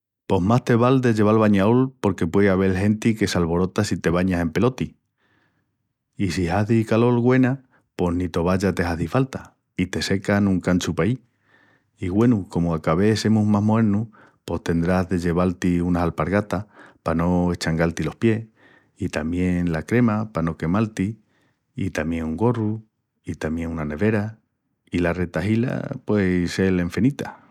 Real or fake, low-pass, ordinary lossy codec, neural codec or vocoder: real; 19.8 kHz; none; none